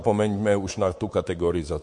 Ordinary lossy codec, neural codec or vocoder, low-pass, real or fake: MP3, 48 kbps; codec, 24 kHz, 3.1 kbps, DualCodec; 10.8 kHz; fake